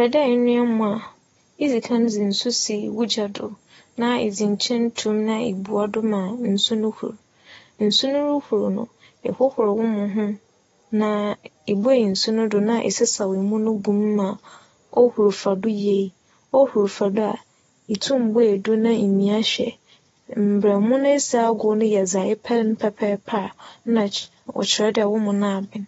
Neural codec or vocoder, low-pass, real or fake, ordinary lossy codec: none; 19.8 kHz; real; AAC, 24 kbps